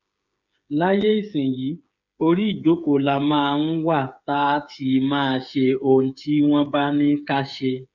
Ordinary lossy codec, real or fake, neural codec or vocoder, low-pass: none; fake; codec, 16 kHz, 8 kbps, FreqCodec, smaller model; 7.2 kHz